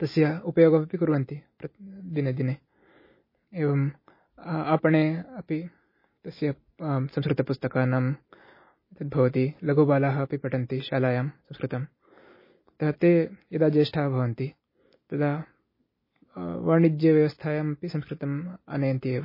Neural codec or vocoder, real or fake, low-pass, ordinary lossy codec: none; real; 5.4 kHz; MP3, 24 kbps